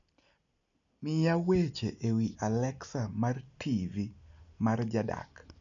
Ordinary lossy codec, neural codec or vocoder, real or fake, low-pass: MP3, 96 kbps; none; real; 7.2 kHz